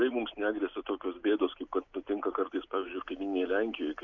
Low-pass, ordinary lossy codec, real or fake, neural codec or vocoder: 7.2 kHz; MP3, 64 kbps; real; none